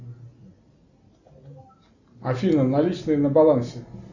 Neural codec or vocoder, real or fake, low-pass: none; real; 7.2 kHz